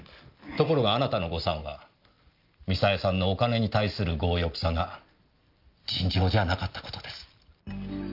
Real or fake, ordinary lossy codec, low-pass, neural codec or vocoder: real; Opus, 24 kbps; 5.4 kHz; none